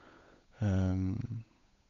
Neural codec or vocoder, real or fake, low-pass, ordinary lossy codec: codec, 16 kHz, 8 kbps, FunCodec, trained on Chinese and English, 25 frames a second; fake; 7.2 kHz; none